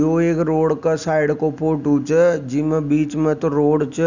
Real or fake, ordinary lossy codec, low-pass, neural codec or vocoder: real; none; 7.2 kHz; none